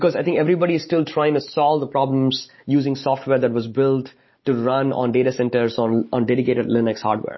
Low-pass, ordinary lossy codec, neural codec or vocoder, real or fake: 7.2 kHz; MP3, 24 kbps; none; real